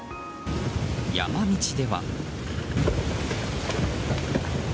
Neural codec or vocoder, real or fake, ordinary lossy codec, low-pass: none; real; none; none